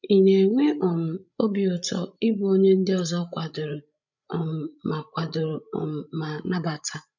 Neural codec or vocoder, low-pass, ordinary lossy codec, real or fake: codec, 16 kHz, 16 kbps, FreqCodec, larger model; 7.2 kHz; none; fake